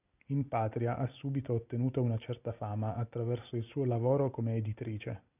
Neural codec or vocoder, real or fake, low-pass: none; real; 3.6 kHz